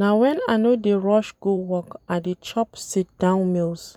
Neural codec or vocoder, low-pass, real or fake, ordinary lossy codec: vocoder, 44.1 kHz, 128 mel bands every 512 samples, BigVGAN v2; 19.8 kHz; fake; none